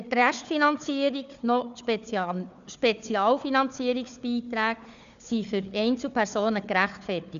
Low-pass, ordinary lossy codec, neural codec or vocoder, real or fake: 7.2 kHz; none; codec, 16 kHz, 4 kbps, FunCodec, trained on Chinese and English, 50 frames a second; fake